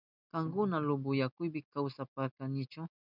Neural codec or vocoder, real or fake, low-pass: none; real; 5.4 kHz